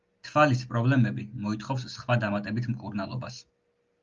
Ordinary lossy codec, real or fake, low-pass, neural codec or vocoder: Opus, 32 kbps; real; 7.2 kHz; none